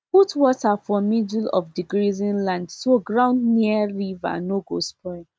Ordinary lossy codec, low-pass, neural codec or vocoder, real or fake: none; none; none; real